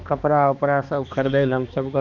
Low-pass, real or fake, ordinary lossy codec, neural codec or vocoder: 7.2 kHz; fake; none; codec, 16 kHz, 2 kbps, X-Codec, HuBERT features, trained on balanced general audio